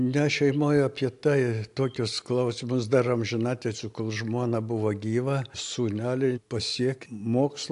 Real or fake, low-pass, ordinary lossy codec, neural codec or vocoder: real; 10.8 kHz; AAC, 64 kbps; none